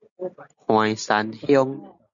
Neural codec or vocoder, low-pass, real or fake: none; 7.2 kHz; real